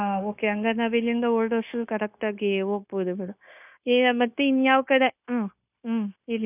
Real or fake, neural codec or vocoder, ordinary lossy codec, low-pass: fake; codec, 16 kHz, 0.9 kbps, LongCat-Audio-Codec; none; 3.6 kHz